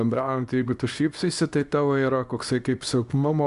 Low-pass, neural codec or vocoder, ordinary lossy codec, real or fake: 10.8 kHz; codec, 24 kHz, 0.9 kbps, WavTokenizer, small release; Opus, 64 kbps; fake